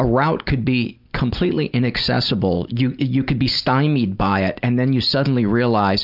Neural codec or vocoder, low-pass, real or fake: none; 5.4 kHz; real